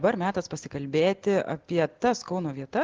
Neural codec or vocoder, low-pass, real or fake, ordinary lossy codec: none; 7.2 kHz; real; Opus, 16 kbps